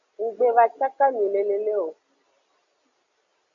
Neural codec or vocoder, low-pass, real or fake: none; 7.2 kHz; real